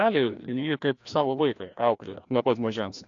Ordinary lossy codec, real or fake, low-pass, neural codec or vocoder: AAC, 48 kbps; fake; 7.2 kHz; codec, 16 kHz, 1 kbps, FreqCodec, larger model